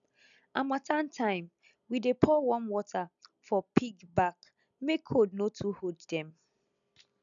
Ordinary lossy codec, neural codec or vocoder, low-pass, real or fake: none; none; 7.2 kHz; real